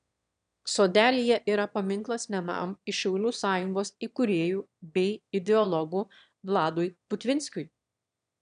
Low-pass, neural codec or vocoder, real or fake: 9.9 kHz; autoencoder, 22.05 kHz, a latent of 192 numbers a frame, VITS, trained on one speaker; fake